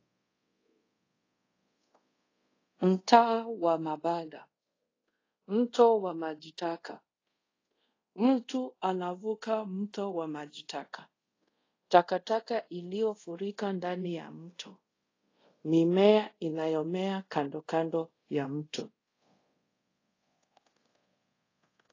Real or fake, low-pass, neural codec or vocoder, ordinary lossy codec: fake; 7.2 kHz; codec, 24 kHz, 0.5 kbps, DualCodec; AAC, 32 kbps